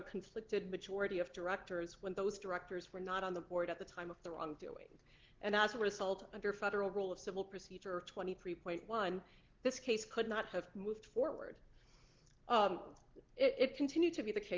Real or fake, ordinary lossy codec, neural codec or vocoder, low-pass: real; Opus, 16 kbps; none; 7.2 kHz